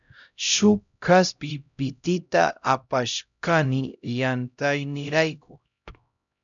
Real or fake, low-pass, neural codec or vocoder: fake; 7.2 kHz; codec, 16 kHz, 0.5 kbps, X-Codec, HuBERT features, trained on LibriSpeech